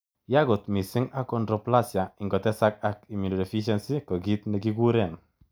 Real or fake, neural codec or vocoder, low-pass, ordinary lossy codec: real; none; none; none